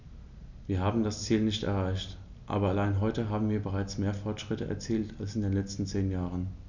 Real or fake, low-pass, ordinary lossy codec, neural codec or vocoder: real; 7.2 kHz; none; none